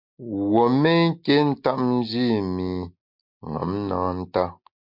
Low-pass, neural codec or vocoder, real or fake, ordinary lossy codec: 5.4 kHz; none; real; MP3, 48 kbps